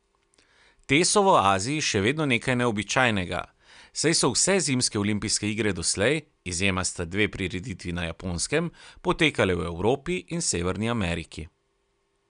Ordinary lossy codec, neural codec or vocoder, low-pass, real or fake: none; none; 9.9 kHz; real